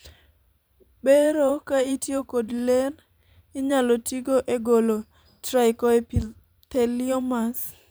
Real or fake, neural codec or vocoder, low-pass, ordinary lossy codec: fake; vocoder, 44.1 kHz, 128 mel bands every 512 samples, BigVGAN v2; none; none